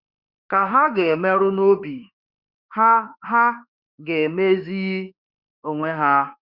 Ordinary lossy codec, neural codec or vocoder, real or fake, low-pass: Opus, 64 kbps; autoencoder, 48 kHz, 32 numbers a frame, DAC-VAE, trained on Japanese speech; fake; 5.4 kHz